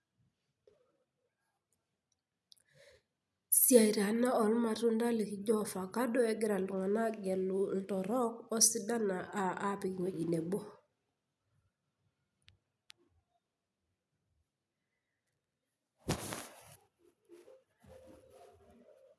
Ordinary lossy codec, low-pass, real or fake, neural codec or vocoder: none; none; real; none